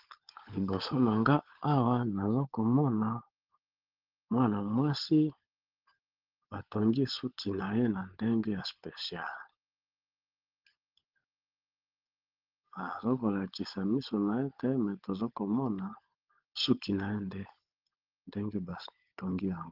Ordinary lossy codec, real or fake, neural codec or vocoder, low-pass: Opus, 16 kbps; fake; codec, 16 kHz, 16 kbps, FunCodec, trained on LibriTTS, 50 frames a second; 5.4 kHz